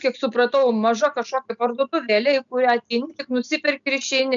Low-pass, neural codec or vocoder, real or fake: 7.2 kHz; none; real